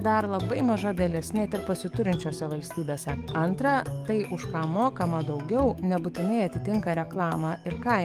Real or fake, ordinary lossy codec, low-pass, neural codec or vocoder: fake; Opus, 32 kbps; 14.4 kHz; codec, 44.1 kHz, 7.8 kbps, DAC